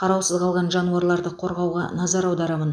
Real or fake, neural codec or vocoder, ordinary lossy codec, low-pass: real; none; none; none